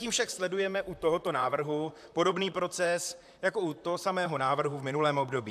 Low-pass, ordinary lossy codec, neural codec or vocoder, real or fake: 14.4 kHz; AAC, 96 kbps; vocoder, 44.1 kHz, 128 mel bands, Pupu-Vocoder; fake